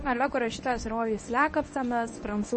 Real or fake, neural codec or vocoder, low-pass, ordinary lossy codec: fake; codec, 24 kHz, 0.9 kbps, WavTokenizer, medium speech release version 1; 10.8 kHz; MP3, 32 kbps